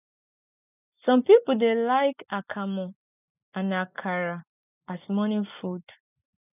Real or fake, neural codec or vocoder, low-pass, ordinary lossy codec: real; none; 3.6 kHz; none